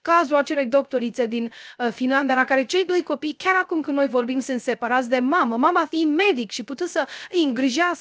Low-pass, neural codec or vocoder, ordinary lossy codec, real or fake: none; codec, 16 kHz, 0.3 kbps, FocalCodec; none; fake